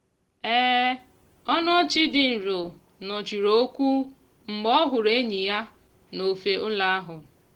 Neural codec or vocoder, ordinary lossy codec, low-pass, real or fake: none; Opus, 16 kbps; 19.8 kHz; real